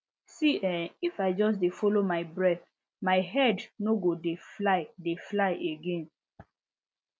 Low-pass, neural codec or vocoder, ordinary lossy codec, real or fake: none; none; none; real